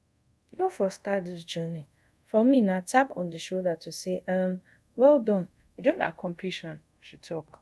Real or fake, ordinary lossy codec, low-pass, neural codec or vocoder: fake; none; none; codec, 24 kHz, 0.5 kbps, DualCodec